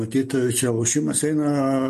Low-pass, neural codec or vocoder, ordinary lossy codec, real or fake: 14.4 kHz; none; MP3, 64 kbps; real